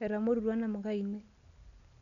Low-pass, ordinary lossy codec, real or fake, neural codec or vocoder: 7.2 kHz; none; real; none